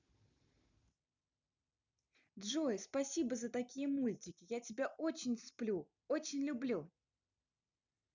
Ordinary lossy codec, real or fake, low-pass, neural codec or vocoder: none; real; 7.2 kHz; none